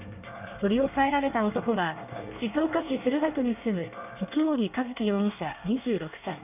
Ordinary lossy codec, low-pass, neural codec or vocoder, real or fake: none; 3.6 kHz; codec, 24 kHz, 1 kbps, SNAC; fake